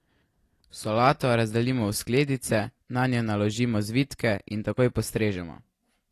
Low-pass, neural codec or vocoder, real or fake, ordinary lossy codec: 14.4 kHz; none; real; AAC, 48 kbps